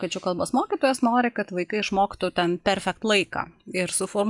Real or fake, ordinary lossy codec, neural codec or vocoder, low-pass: real; MP3, 96 kbps; none; 10.8 kHz